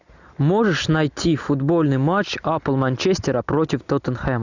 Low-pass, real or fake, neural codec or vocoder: 7.2 kHz; real; none